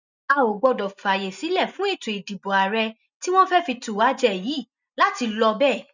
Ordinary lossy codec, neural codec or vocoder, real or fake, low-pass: none; none; real; 7.2 kHz